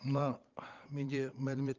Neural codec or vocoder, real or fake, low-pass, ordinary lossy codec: vocoder, 22.05 kHz, 80 mel bands, Vocos; fake; 7.2 kHz; Opus, 32 kbps